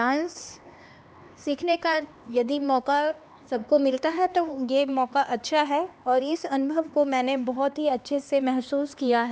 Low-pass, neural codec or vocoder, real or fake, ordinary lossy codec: none; codec, 16 kHz, 2 kbps, X-Codec, HuBERT features, trained on LibriSpeech; fake; none